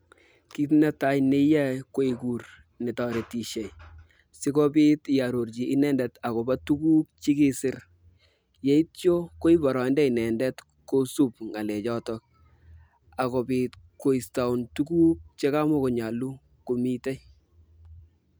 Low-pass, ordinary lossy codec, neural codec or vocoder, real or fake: none; none; none; real